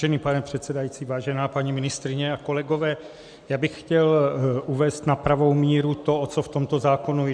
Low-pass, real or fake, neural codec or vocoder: 9.9 kHz; real; none